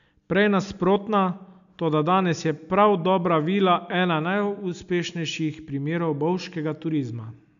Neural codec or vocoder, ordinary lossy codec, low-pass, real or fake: none; none; 7.2 kHz; real